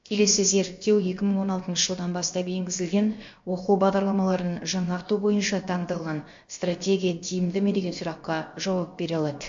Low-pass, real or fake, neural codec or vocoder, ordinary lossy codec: 7.2 kHz; fake; codec, 16 kHz, about 1 kbps, DyCAST, with the encoder's durations; MP3, 48 kbps